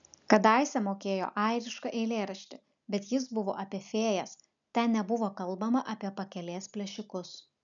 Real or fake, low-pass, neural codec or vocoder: real; 7.2 kHz; none